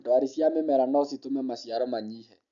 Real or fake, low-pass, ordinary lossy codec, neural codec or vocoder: real; 7.2 kHz; none; none